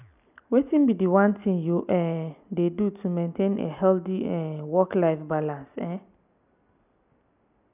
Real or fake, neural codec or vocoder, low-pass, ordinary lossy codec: real; none; 3.6 kHz; none